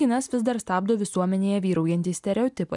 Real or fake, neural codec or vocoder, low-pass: real; none; 10.8 kHz